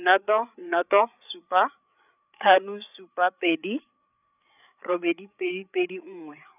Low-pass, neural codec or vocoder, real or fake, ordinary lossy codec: 3.6 kHz; codec, 16 kHz, 8 kbps, FreqCodec, larger model; fake; none